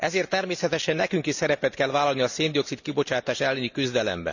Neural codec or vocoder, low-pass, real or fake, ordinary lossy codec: none; 7.2 kHz; real; none